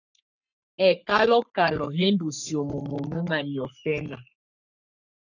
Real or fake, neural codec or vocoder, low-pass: fake; codec, 44.1 kHz, 3.4 kbps, Pupu-Codec; 7.2 kHz